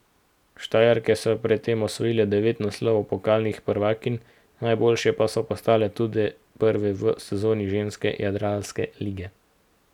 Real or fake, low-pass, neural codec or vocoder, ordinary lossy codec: real; 19.8 kHz; none; none